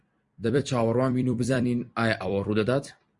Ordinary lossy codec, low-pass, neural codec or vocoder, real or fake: Opus, 64 kbps; 10.8 kHz; vocoder, 44.1 kHz, 128 mel bands every 256 samples, BigVGAN v2; fake